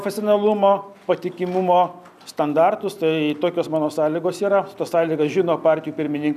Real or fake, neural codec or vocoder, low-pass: real; none; 14.4 kHz